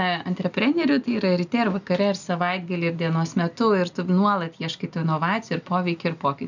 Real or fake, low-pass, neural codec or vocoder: real; 7.2 kHz; none